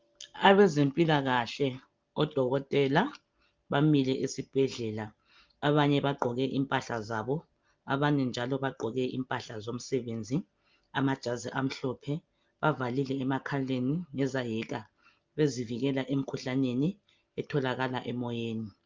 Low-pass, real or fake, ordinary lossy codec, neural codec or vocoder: 7.2 kHz; real; Opus, 24 kbps; none